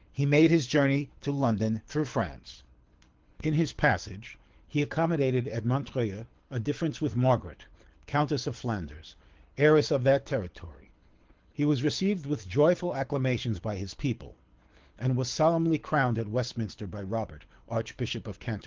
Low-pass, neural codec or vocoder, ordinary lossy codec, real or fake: 7.2 kHz; codec, 24 kHz, 6 kbps, HILCodec; Opus, 16 kbps; fake